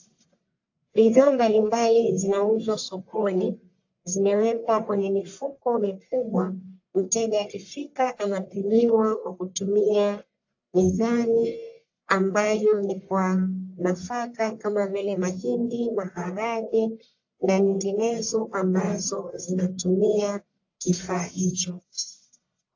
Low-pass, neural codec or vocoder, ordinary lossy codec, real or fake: 7.2 kHz; codec, 44.1 kHz, 1.7 kbps, Pupu-Codec; AAC, 48 kbps; fake